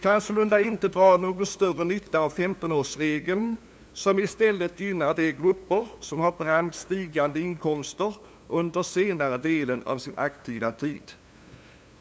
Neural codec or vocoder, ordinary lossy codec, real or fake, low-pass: codec, 16 kHz, 2 kbps, FunCodec, trained on LibriTTS, 25 frames a second; none; fake; none